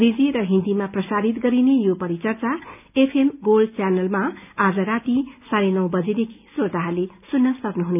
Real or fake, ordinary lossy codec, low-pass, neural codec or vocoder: real; none; 3.6 kHz; none